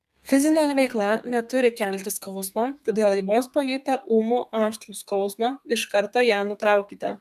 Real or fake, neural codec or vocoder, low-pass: fake; codec, 32 kHz, 1.9 kbps, SNAC; 14.4 kHz